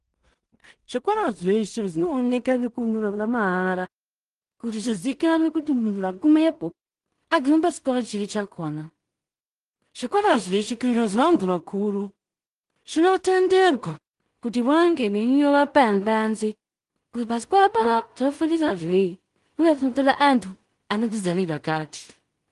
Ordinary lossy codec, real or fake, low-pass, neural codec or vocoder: Opus, 24 kbps; fake; 10.8 kHz; codec, 16 kHz in and 24 kHz out, 0.4 kbps, LongCat-Audio-Codec, two codebook decoder